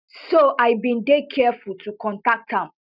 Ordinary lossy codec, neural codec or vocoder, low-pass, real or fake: none; none; 5.4 kHz; real